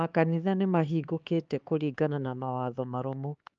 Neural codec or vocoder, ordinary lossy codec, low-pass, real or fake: codec, 16 kHz, 4 kbps, X-Codec, HuBERT features, trained on LibriSpeech; Opus, 24 kbps; 7.2 kHz; fake